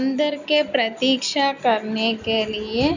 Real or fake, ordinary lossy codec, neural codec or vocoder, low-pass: real; none; none; 7.2 kHz